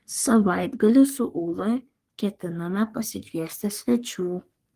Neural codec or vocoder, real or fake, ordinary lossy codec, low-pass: codec, 44.1 kHz, 3.4 kbps, Pupu-Codec; fake; Opus, 24 kbps; 14.4 kHz